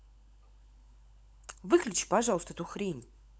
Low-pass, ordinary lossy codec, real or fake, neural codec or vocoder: none; none; fake; codec, 16 kHz, 16 kbps, FunCodec, trained on LibriTTS, 50 frames a second